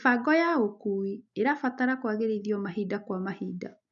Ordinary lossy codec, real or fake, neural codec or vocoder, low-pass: none; real; none; 7.2 kHz